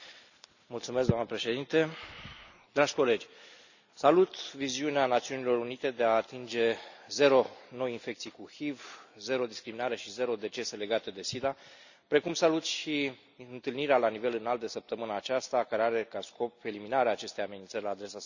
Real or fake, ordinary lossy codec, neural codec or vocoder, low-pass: real; none; none; 7.2 kHz